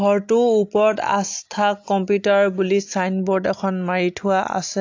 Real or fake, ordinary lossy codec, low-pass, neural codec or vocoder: real; AAC, 48 kbps; 7.2 kHz; none